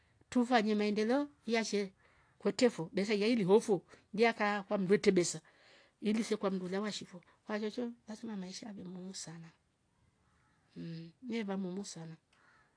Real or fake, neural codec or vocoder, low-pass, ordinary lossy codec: real; none; 9.9 kHz; AAC, 48 kbps